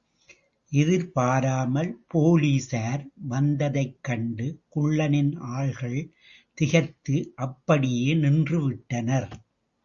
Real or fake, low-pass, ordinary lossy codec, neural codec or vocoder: real; 7.2 kHz; Opus, 64 kbps; none